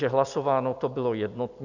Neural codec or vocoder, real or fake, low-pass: none; real; 7.2 kHz